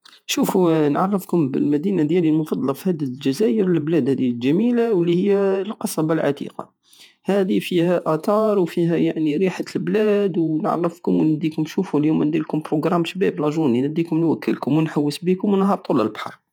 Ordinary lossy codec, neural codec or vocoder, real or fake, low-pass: none; vocoder, 48 kHz, 128 mel bands, Vocos; fake; 19.8 kHz